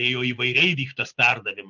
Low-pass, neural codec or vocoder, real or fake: 7.2 kHz; none; real